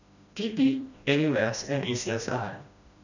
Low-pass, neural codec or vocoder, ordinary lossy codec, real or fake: 7.2 kHz; codec, 16 kHz, 1 kbps, FreqCodec, smaller model; none; fake